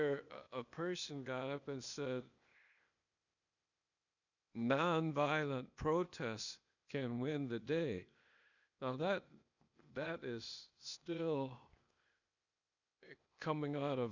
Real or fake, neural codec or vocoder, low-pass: fake; codec, 16 kHz, 0.8 kbps, ZipCodec; 7.2 kHz